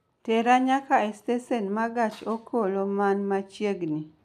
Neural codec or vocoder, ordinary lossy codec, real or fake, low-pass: none; MP3, 96 kbps; real; 14.4 kHz